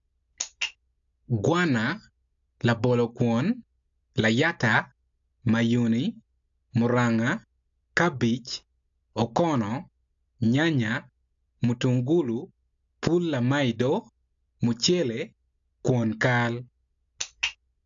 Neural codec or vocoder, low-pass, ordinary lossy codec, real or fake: none; 7.2 kHz; AAC, 64 kbps; real